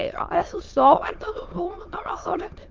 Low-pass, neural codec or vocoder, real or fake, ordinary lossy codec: 7.2 kHz; autoencoder, 22.05 kHz, a latent of 192 numbers a frame, VITS, trained on many speakers; fake; Opus, 32 kbps